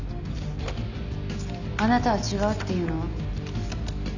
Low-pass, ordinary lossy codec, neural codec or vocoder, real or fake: 7.2 kHz; none; none; real